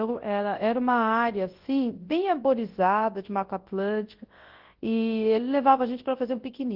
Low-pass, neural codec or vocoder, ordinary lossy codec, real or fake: 5.4 kHz; codec, 24 kHz, 0.9 kbps, WavTokenizer, large speech release; Opus, 16 kbps; fake